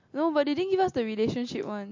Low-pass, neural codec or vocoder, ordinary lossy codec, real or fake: 7.2 kHz; none; MP3, 48 kbps; real